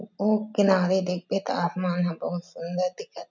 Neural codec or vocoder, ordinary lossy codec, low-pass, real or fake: none; none; 7.2 kHz; real